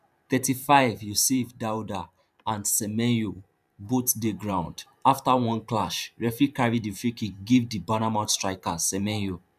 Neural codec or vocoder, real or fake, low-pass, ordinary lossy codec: vocoder, 44.1 kHz, 128 mel bands every 512 samples, BigVGAN v2; fake; 14.4 kHz; none